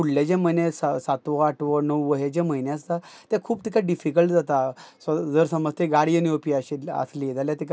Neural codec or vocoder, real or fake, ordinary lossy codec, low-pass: none; real; none; none